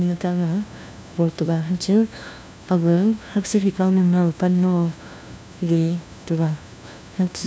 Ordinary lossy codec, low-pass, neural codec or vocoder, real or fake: none; none; codec, 16 kHz, 1 kbps, FunCodec, trained on LibriTTS, 50 frames a second; fake